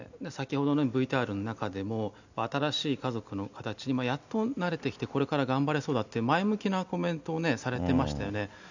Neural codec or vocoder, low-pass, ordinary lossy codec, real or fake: none; 7.2 kHz; none; real